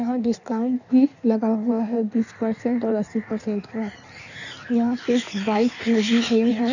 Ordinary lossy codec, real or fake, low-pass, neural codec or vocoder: none; fake; 7.2 kHz; codec, 16 kHz in and 24 kHz out, 1.1 kbps, FireRedTTS-2 codec